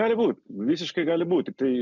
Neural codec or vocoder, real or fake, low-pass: none; real; 7.2 kHz